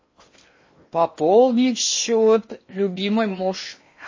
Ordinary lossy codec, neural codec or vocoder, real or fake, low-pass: MP3, 32 kbps; codec, 16 kHz in and 24 kHz out, 0.8 kbps, FocalCodec, streaming, 65536 codes; fake; 7.2 kHz